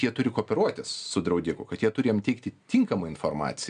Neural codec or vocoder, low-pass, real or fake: none; 9.9 kHz; real